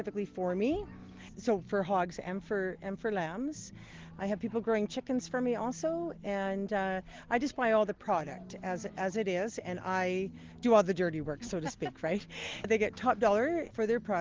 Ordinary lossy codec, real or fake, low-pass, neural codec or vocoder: Opus, 16 kbps; real; 7.2 kHz; none